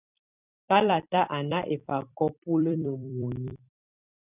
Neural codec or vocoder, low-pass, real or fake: vocoder, 44.1 kHz, 128 mel bands every 256 samples, BigVGAN v2; 3.6 kHz; fake